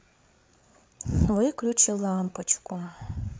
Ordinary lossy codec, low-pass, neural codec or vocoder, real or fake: none; none; codec, 16 kHz, 8 kbps, FreqCodec, larger model; fake